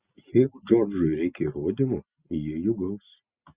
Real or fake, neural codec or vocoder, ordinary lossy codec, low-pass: real; none; Opus, 64 kbps; 3.6 kHz